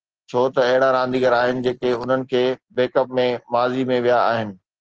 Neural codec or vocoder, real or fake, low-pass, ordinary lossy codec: none; real; 7.2 kHz; Opus, 16 kbps